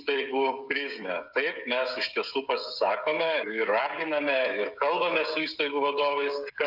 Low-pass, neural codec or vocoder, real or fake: 5.4 kHz; codec, 16 kHz, 16 kbps, FreqCodec, smaller model; fake